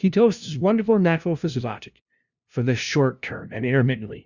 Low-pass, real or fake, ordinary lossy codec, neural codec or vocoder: 7.2 kHz; fake; Opus, 64 kbps; codec, 16 kHz, 0.5 kbps, FunCodec, trained on LibriTTS, 25 frames a second